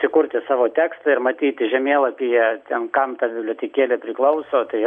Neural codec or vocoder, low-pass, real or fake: none; 9.9 kHz; real